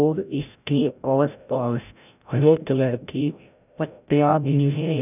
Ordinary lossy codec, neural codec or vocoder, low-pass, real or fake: none; codec, 16 kHz, 0.5 kbps, FreqCodec, larger model; 3.6 kHz; fake